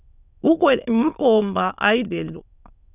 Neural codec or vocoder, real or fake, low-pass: autoencoder, 22.05 kHz, a latent of 192 numbers a frame, VITS, trained on many speakers; fake; 3.6 kHz